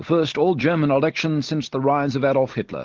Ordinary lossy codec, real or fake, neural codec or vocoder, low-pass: Opus, 16 kbps; real; none; 7.2 kHz